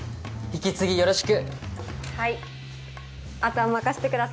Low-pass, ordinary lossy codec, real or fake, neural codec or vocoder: none; none; real; none